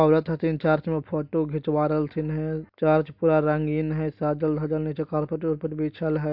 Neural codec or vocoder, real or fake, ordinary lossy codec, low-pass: none; real; MP3, 48 kbps; 5.4 kHz